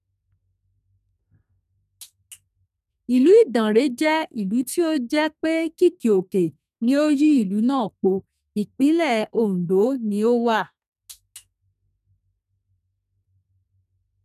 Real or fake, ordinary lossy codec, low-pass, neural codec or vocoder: fake; none; 14.4 kHz; codec, 32 kHz, 1.9 kbps, SNAC